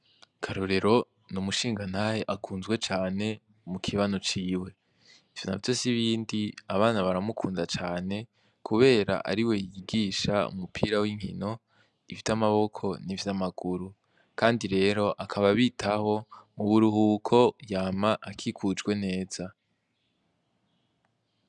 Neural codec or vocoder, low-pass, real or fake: none; 10.8 kHz; real